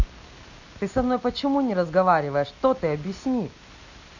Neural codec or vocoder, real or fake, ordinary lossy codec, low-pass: none; real; none; 7.2 kHz